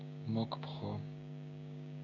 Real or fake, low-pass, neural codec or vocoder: fake; 7.2 kHz; codec, 16 kHz, 6 kbps, DAC